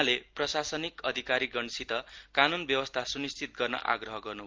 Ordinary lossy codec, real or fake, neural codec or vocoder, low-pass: Opus, 24 kbps; real; none; 7.2 kHz